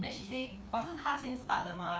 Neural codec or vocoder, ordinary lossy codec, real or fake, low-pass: codec, 16 kHz, 2 kbps, FreqCodec, larger model; none; fake; none